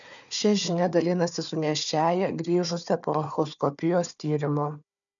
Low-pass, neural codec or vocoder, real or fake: 7.2 kHz; codec, 16 kHz, 4 kbps, FunCodec, trained on Chinese and English, 50 frames a second; fake